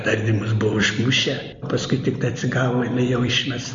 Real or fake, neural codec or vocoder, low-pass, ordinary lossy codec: real; none; 7.2 kHz; MP3, 48 kbps